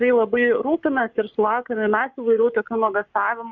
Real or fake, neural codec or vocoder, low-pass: fake; codec, 44.1 kHz, 7.8 kbps, Pupu-Codec; 7.2 kHz